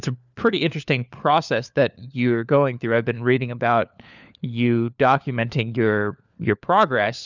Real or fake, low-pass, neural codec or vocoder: fake; 7.2 kHz; codec, 16 kHz, 4 kbps, FunCodec, trained on LibriTTS, 50 frames a second